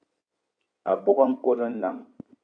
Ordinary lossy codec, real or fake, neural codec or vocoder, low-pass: AAC, 64 kbps; fake; codec, 16 kHz in and 24 kHz out, 2.2 kbps, FireRedTTS-2 codec; 9.9 kHz